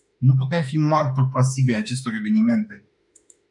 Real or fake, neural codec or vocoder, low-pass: fake; autoencoder, 48 kHz, 32 numbers a frame, DAC-VAE, trained on Japanese speech; 10.8 kHz